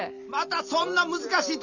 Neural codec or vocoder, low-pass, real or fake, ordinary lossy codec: none; 7.2 kHz; real; none